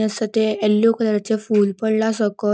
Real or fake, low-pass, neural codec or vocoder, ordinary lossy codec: real; none; none; none